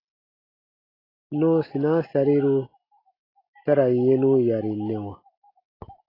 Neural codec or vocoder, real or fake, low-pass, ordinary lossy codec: none; real; 5.4 kHz; AAC, 24 kbps